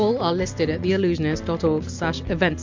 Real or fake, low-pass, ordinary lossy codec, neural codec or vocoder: real; 7.2 kHz; MP3, 64 kbps; none